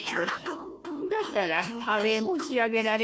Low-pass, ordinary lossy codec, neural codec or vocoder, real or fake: none; none; codec, 16 kHz, 1 kbps, FunCodec, trained on Chinese and English, 50 frames a second; fake